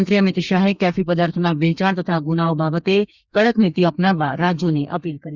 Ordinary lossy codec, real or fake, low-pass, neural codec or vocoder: Opus, 64 kbps; fake; 7.2 kHz; codec, 32 kHz, 1.9 kbps, SNAC